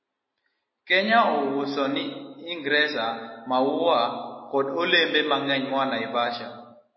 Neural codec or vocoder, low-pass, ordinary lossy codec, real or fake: none; 7.2 kHz; MP3, 24 kbps; real